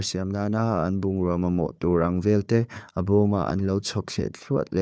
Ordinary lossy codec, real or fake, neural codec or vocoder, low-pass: none; fake; codec, 16 kHz, 4 kbps, FunCodec, trained on Chinese and English, 50 frames a second; none